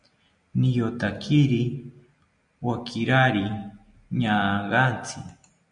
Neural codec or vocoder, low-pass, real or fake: none; 9.9 kHz; real